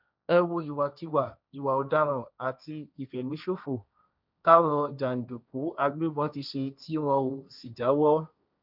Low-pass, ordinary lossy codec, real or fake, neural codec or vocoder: 5.4 kHz; none; fake; codec, 16 kHz, 1.1 kbps, Voila-Tokenizer